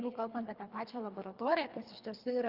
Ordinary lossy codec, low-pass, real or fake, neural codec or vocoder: Opus, 24 kbps; 5.4 kHz; fake; codec, 24 kHz, 3 kbps, HILCodec